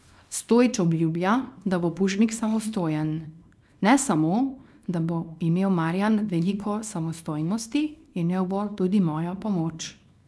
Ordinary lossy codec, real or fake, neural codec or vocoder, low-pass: none; fake; codec, 24 kHz, 0.9 kbps, WavTokenizer, small release; none